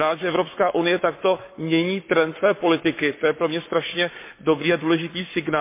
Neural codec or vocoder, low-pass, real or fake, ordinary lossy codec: codec, 44.1 kHz, 7.8 kbps, Pupu-Codec; 3.6 kHz; fake; MP3, 24 kbps